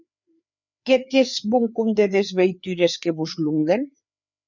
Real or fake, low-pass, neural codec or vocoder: fake; 7.2 kHz; codec, 16 kHz, 4 kbps, FreqCodec, larger model